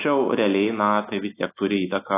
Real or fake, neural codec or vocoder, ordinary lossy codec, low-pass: real; none; AAC, 16 kbps; 3.6 kHz